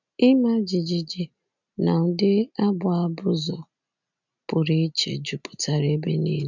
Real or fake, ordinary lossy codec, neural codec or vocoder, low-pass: real; none; none; 7.2 kHz